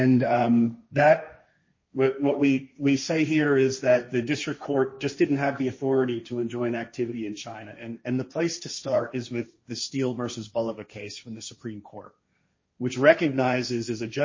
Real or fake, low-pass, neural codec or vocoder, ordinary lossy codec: fake; 7.2 kHz; codec, 16 kHz, 1.1 kbps, Voila-Tokenizer; MP3, 32 kbps